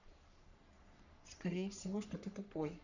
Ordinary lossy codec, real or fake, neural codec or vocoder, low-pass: none; fake; codec, 44.1 kHz, 3.4 kbps, Pupu-Codec; 7.2 kHz